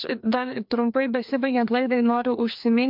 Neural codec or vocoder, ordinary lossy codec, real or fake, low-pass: codec, 16 kHz, 2 kbps, FreqCodec, larger model; MP3, 48 kbps; fake; 5.4 kHz